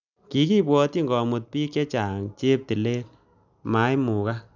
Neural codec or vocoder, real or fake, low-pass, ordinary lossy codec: none; real; 7.2 kHz; none